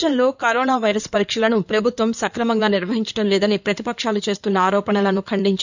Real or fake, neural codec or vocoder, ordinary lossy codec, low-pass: fake; codec, 16 kHz in and 24 kHz out, 2.2 kbps, FireRedTTS-2 codec; none; 7.2 kHz